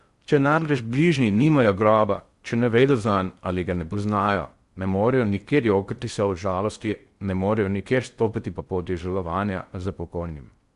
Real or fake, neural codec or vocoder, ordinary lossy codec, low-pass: fake; codec, 16 kHz in and 24 kHz out, 0.6 kbps, FocalCodec, streaming, 4096 codes; Opus, 64 kbps; 10.8 kHz